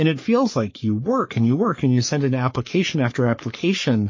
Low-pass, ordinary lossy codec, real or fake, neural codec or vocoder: 7.2 kHz; MP3, 32 kbps; fake; codec, 44.1 kHz, 3.4 kbps, Pupu-Codec